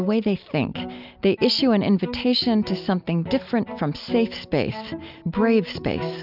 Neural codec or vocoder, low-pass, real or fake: none; 5.4 kHz; real